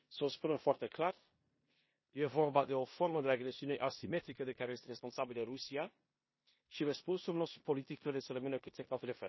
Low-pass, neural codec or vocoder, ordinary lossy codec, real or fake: 7.2 kHz; codec, 16 kHz in and 24 kHz out, 0.9 kbps, LongCat-Audio-Codec, four codebook decoder; MP3, 24 kbps; fake